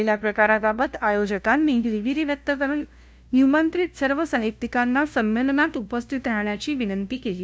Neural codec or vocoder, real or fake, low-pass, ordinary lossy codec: codec, 16 kHz, 0.5 kbps, FunCodec, trained on LibriTTS, 25 frames a second; fake; none; none